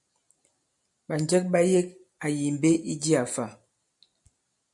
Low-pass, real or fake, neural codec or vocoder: 10.8 kHz; real; none